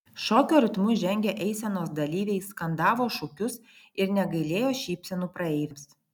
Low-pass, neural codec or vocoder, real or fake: 19.8 kHz; none; real